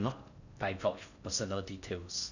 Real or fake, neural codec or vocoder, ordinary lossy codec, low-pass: fake; codec, 16 kHz in and 24 kHz out, 0.6 kbps, FocalCodec, streaming, 4096 codes; none; 7.2 kHz